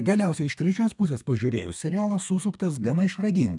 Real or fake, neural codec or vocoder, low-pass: fake; codec, 32 kHz, 1.9 kbps, SNAC; 10.8 kHz